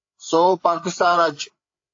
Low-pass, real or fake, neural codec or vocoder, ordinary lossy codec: 7.2 kHz; fake; codec, 16 kHz, 8 kbps, FreqCodec, larger model; AAC, 48 kbps